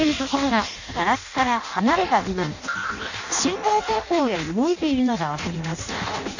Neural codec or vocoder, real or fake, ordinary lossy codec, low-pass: codec, 16 kHz in and 24 kHz out, 0.6 kbps, FireRedTTS-2 codec; fake; none; 7.2 kHz